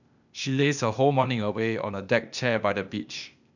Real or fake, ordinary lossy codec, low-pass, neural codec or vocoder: fake; none; 7.2 kHz; codec, 16 kHz, 0.8 kbps, ZipCodec